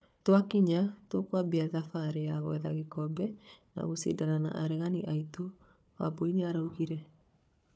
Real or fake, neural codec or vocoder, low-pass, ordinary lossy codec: fake; codec, 16 kHz, 4 kbps, FunCodec, trained on Chinese and English, 50 frames a second; none; none